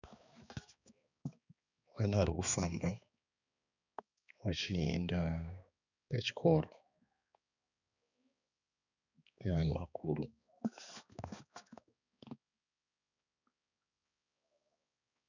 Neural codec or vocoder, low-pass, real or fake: codec, 16 kHz, 2 kbps, X-Codec, HuBERT features, trained on balanced general audio; 7.2 kHz; fake